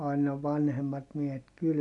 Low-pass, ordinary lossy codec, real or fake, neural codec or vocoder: 10.8 kHz; none; real; none